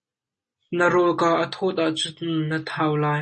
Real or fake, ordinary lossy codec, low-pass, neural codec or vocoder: real; MP3, 32 kbps; 9.9 kHz; none